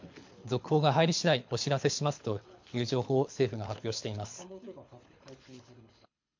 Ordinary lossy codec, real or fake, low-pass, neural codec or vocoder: MP3, 48 kbps; fake; 7.2 kHz; codec, 24 kHz, 6 kbps, HILCodec